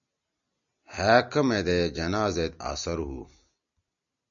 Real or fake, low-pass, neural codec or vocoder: real; 7.2 kHz; none